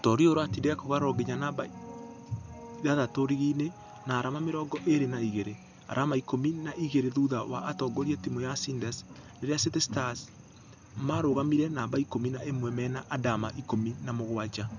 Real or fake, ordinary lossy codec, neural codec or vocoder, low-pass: real; none; none; 7.2 kHz